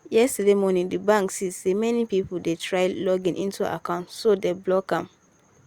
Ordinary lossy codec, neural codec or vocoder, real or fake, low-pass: Opus, 64 kbps; none; real; 19.8 kHz